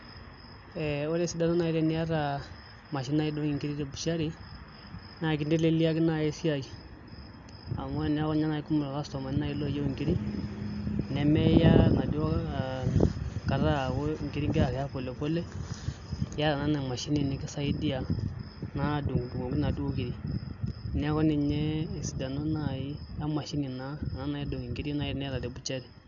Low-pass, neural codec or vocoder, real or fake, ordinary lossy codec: 7.2 kHz; none; real; AAC, 64 kbps